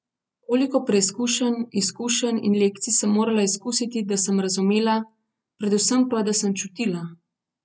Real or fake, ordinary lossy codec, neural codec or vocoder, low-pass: real; none; none; none